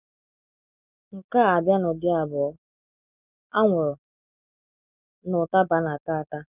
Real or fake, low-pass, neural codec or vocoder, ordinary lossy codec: real; 3.6 kHz; none; none